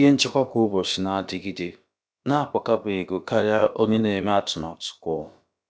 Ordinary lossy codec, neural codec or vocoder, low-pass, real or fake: none; codec, 16 kHz, about 1 kbps, DyCAST, with the encoder's durations; none; fake